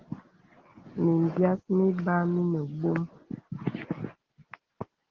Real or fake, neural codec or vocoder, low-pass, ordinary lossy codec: real; none; 7.2 kHz; Opus, 16 kbps